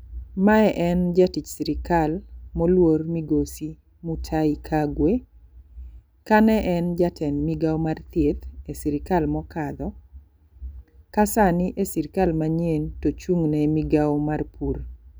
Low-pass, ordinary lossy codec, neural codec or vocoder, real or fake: none; none; none; real